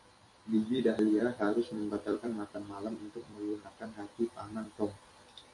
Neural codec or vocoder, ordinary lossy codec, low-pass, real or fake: vocoder, 44.1 kHz, 128 mel bands every 256 samples, BigVGAN v2; AAC, 48 kbps; 10.8 kHz; fake